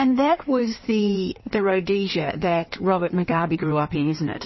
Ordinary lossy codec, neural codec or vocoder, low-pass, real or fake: MP3, 24 kbps; codec, 16 kHz in and 24 kHz out, 1.1 kbps, FireRedTTS-2 codec; 7.2 kHz; fake